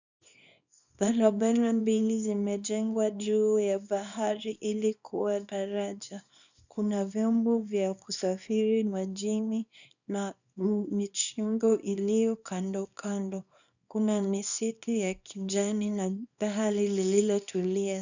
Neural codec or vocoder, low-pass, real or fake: codec, 24 kHz, 0.9 kbps, WavTokenizer, small release; 7.2 kHz; fake